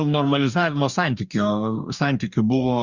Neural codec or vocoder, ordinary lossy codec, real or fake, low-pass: codec, 44.1 kHz, 2.6 kbps, DAC; Opus, 64 kbps; fake; 7.2 kHz